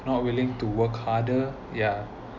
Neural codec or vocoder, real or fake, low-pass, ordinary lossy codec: none; real; 7.2 kHz; none